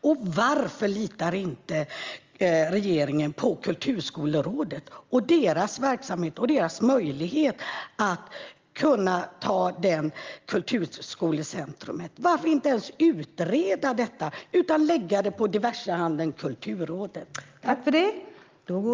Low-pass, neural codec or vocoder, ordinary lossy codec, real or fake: 7.2 kHz; none; Opus, 32 kbps; real